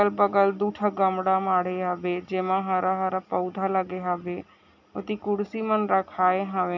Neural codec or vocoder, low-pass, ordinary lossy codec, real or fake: none; 7.2 kHz; none; real